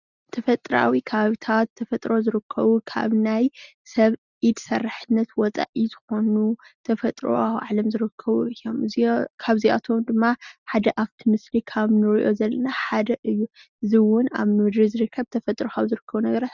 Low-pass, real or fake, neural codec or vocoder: 7.2 kHz; real; none